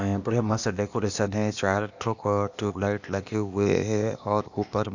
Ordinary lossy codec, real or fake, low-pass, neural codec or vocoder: none; fake; 7.2 kHz; codec, 16 kHz, 0.8 kbps, ZipCodec